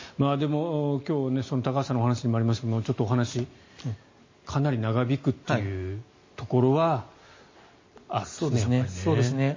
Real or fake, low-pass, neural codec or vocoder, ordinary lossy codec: real; 7.2 kHz; none; MP3, 32 kbps